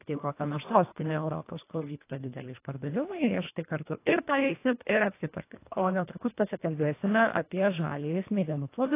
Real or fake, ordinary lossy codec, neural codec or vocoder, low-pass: fake; AAC, 24 kbps; codec, 24 kHz, 1.5 kbps, HILCodec; 3.6 kHz